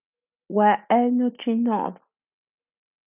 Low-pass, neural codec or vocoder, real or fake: 3.6 kHz; none; real